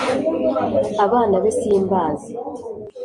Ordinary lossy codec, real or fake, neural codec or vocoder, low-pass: MP3, 48 kbps; real; none; 10.8 kHz